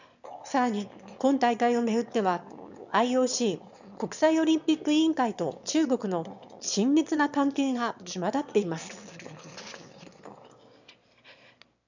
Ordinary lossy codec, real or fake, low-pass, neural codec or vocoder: none; fake; 7.2 kHz; autoencoder, 22.05 kHz, a latent of 192 numbers a frame, VITS, trained on one speaker